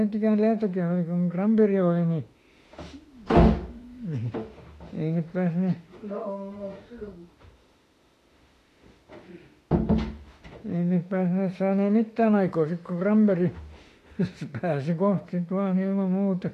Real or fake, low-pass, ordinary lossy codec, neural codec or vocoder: fake; 14.4 kHz; AAC, 48 kbps; autoencoder, 48 kHz, 32 numbers a frame, DAC-VAE, trained on Japanese speech